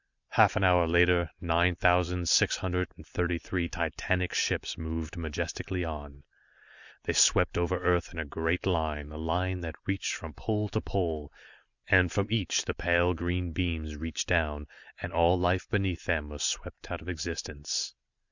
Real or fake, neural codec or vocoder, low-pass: real; none; 7.2 kHz